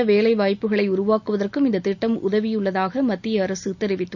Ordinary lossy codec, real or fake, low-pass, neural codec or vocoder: none; real; 7.2 kHz; none